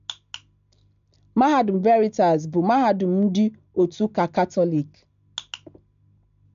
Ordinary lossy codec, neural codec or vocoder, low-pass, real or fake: MP3, 64 kbps; none; 7.2 kHz; real